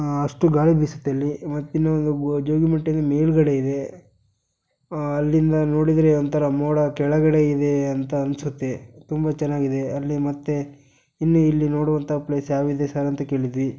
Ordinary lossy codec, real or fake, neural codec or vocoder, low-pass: none; real; none; none